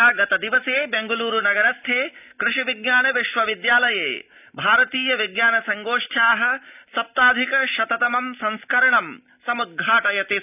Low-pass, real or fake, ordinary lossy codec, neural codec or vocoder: 3.6 kHz; real; none; none